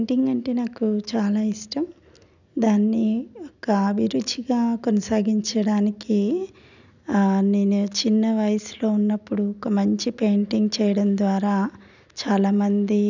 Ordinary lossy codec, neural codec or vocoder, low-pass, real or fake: none; none; 7.2 kHz; real